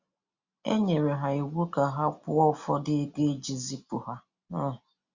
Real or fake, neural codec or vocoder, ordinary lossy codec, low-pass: real; none; Opus, 64 kbps; 7.2 kHz